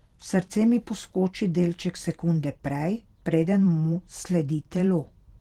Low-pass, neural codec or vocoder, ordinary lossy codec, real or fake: 19.8 kHz; vocoder, 44.1 kHz, 128 mel bands every 512 samples, BigVGAN v2; Opus, 16 kbps; fake